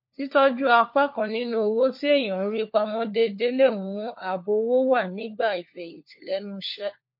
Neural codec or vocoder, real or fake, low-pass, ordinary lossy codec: codec, 16 kHz, 4 kbps, FunCodec, trained on LibriTTS, 50 frames a second; fake; 5.4 kHz; MP3, 32 kbps